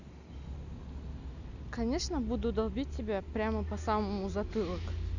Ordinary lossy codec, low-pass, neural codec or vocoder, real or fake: none; 7.2 kHz; none; real